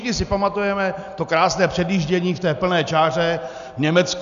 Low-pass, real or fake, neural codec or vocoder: 7.2 kHz; real; none